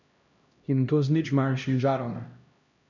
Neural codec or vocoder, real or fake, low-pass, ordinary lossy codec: codec, 16 kHz, 1 kbps, X-Codec, HuBERT features, trained on LibriSpeech; fake; 7.2 kHz; none